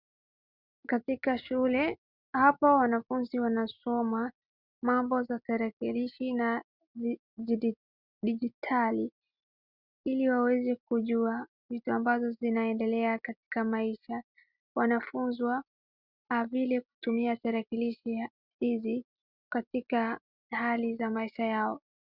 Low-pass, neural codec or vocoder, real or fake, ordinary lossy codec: 5.4 kHz; none; real; Opus, 64 kbps